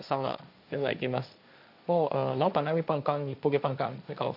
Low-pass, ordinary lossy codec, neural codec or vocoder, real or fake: 5.4 kHz; none; codec, 16 kHz, 1.1 kbps, Voila-Tokenizer; fake